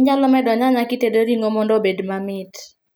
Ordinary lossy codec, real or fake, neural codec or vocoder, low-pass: none; real; none; none